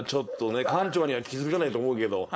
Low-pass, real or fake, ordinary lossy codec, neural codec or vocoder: none; fake; none; codec, 16 kHz, 4.8 kbps, FACodec